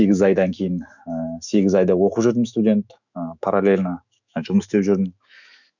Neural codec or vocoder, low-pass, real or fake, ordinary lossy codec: autoencoder, 48 kHz, 128 numbers a frame, DAC-VAE, trained on Japanese speech; 7.2 kHz; fake; none